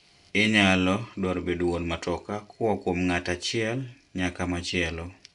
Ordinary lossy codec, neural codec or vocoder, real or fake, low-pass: none; none; real; 10.8 kHz